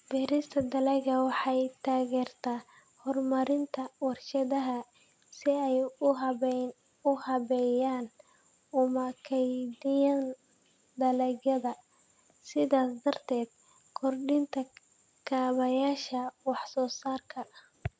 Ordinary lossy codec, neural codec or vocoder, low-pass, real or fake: none; none; none; real